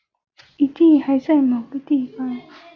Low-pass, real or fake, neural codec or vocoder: 7.2 kHz; real; none